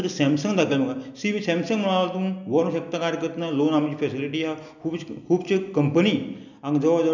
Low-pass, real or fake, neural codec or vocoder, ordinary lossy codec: 7.2 kHz; real; none; none